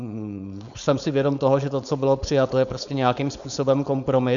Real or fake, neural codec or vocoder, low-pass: fake; codec, 16 kHz, 4.8 kbps, FACodec; 7.2 kHz